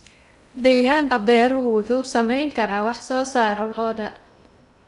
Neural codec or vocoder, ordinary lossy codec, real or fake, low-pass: codec, 16 kHz in and 24 kHz out, 0.6 kbps, FocalCodec, streaming, 2048 codes; MP3, 96 kbps; fake; 10.8 kHz